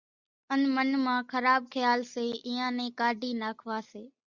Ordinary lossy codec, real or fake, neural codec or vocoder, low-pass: Opus, 64 kbps; real; none; 7.2 kHz